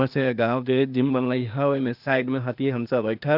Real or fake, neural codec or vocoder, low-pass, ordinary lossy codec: fake; codec, 16 kHz, 0.8 kbps, ZipCodec; 5.4 kHz; none